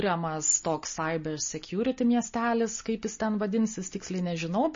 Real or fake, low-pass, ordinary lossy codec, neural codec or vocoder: real; 7.2 kHz; MP3, 32 kbps; none